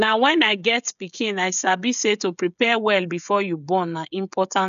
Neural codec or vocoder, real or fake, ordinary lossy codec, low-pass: codec, 16 kHz, 16 kbps, FreqCodec, smaller model; fake; none; 7.2 kHz